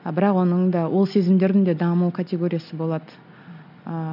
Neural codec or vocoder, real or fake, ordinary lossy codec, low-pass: none; real; none; 5.4 kHz